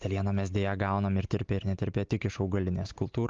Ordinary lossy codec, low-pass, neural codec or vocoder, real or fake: Opus, 32 kbps; 7.2 kHz; none; real